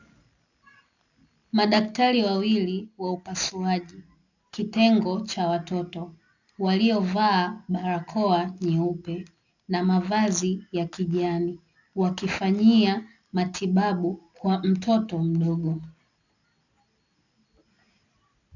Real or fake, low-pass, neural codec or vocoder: real; 7.2 kHz; none